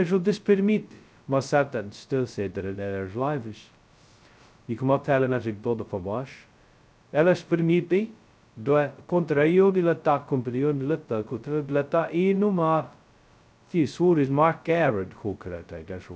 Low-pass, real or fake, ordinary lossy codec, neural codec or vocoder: none; fake; none; codec, 16 kHz, 0.2 kbps, FocalCodec